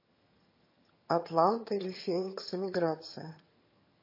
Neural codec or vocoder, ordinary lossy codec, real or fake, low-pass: vocoder, 22.05 kHz, 80 mel bands, HiFi-GAN; MP3, 24 kbps; fake; 5.4 kHz